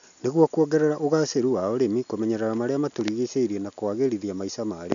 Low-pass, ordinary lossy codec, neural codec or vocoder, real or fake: 7.2 kHz; none; none; real